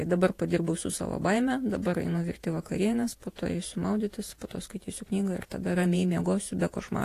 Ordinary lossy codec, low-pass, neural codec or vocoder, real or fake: AAC, 48 kbps; 14.4 kHz; vocoder, 48 kHz, 128 mel bands, Vocos; fake